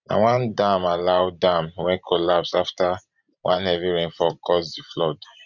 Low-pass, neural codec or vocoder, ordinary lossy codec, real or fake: 7.2 kHz; vocoder, 44.1 kHz, 128 mel bands every 512 samples, BigVGAN v2; none; fake